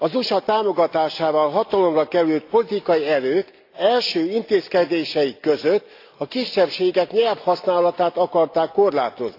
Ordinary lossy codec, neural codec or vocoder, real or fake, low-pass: AAC, 32 kbps; none; real; 5.4 kHz